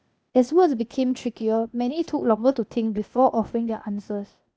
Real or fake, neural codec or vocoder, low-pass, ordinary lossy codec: fake; codec, 16 kHz, 0.8 kbps, ZipCodec; none; none